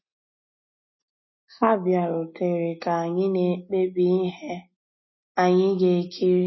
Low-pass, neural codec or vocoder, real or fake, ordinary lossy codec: 7.2 kHz; none; real; MP3, 24 kbps